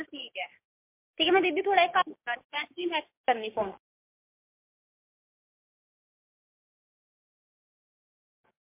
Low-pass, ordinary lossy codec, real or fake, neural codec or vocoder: 3.6 kHz; MP3, 32 kbps; fake; codec, 16 kHz, 6 kbps, DAC